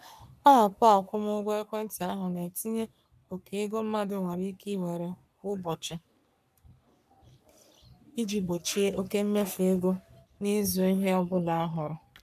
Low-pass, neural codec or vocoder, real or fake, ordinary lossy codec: 14.4 kHz; codec, 44.1 kHz, 3.4 kbps, Pupu-Codec; fake; none